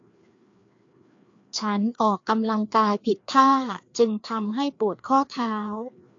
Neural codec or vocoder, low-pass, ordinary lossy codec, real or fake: codec, 16 kHz, 2 kbps, FreqCodec, larger model; 7.2 kHz; AAC, 48 kbps; fake